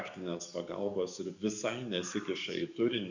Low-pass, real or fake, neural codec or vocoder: 7.2 kHz; fake; codec, 44.1 kHz, 7.8 kbps, DAC